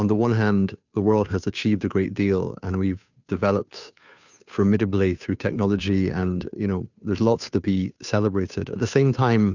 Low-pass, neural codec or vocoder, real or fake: 7.2 kHz; codec, 16 kHz, 2 kbps, FunCodec, trained on Chinese and English, 25 frames a second; fake